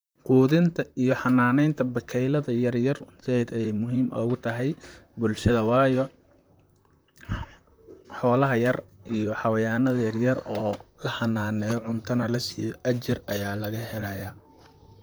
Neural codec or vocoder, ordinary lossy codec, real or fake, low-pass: vocoder, 44.1 kHz, 128 mel bands, Pupu-Vocoder; none; fake; none